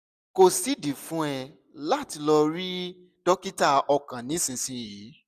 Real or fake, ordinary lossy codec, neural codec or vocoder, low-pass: real; none; none; 14.4 kHz